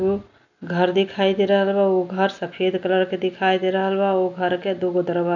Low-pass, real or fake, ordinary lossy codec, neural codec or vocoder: 7.2 kHz; real; none; none